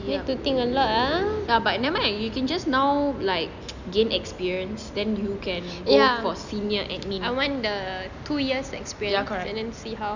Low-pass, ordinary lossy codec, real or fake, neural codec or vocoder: 7.2 kHz; none; real; none